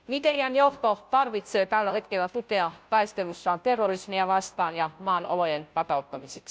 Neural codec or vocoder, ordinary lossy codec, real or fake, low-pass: codec, 16 kHz, 0.5 kbps, FunCodec, trained on Chinese and English, 25 frames a second; none; fake; none